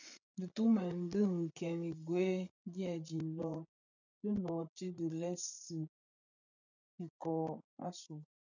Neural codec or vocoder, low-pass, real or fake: codec, 16 kHz, 8 kbps, FreqCodec, larger model; 7.2 kHz; fake